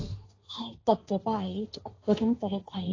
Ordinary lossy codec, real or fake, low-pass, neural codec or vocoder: AAC, 32 kbps; fake; 7.2 kHz; codec, 16 kHz, 1.1 kbps, Voila-Tokenizer